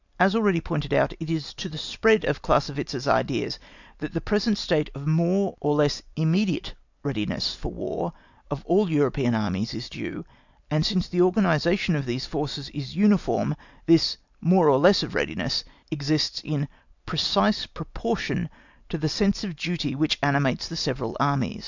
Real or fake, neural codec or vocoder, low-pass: real; none; 7.2 kHz